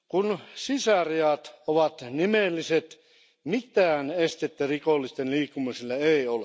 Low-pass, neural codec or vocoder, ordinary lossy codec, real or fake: none; none; none; real